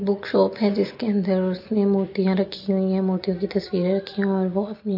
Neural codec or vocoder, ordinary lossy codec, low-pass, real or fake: none; MP3, 32 kbps; 5.4 kHz; real